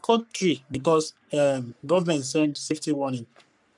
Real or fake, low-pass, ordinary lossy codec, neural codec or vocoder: fake; 10.8 kHz; none; codec, 44.1 kHz, 3.4 kbps, Pupu-Codec